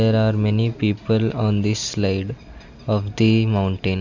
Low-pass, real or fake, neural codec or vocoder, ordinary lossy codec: 7.2 kHz; real; none; none